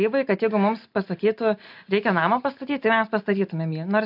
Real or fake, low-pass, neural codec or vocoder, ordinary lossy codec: real; 5.4 kHz; none; AAC, 48 kbps